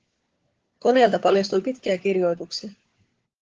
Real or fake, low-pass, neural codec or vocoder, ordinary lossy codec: fake; 7.2 kHz; codec, 16 kHz, 4 kbps, FunCodec, trained on LibriTTS, 50 frames a second; Opus, 16 kbps